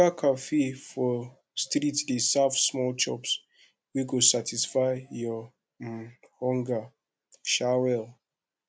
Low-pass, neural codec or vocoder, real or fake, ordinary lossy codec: none; none; real; none